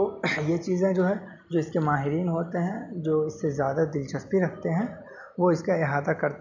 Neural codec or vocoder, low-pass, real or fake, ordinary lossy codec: none; 7.2 kHz; real; none